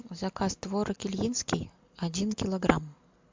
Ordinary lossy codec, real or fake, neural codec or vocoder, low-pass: MP3, 64 kbps; real; none; 7.2 kHz